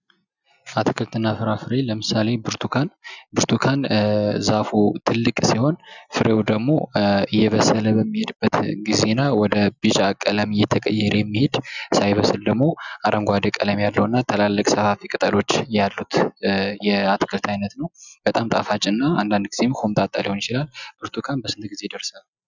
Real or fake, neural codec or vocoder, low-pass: real; none; 7.2 kHz